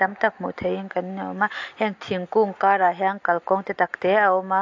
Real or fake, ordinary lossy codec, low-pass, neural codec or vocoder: real; AAC, 48 kbps; 7.2 kHz; none